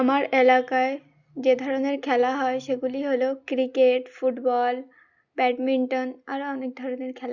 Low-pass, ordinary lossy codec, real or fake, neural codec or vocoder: 7.2 kHz; Opus, 64 kbps; real; none